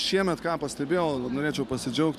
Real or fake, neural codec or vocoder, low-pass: fake; vocoder, 44.1 kHz, 128 mel bands every 512 samples, BigVGAN v2; 14.4 kHz